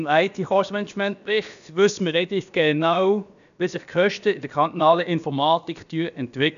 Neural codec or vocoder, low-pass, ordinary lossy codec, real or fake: codec, 16 kHz, about 1 kbps, DyCAST, with the encoder's durations; 7.2 kHz; none; fake